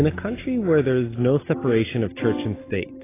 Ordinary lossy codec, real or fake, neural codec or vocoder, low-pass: AAC, 16 kbps; real; none; 3.6 kHz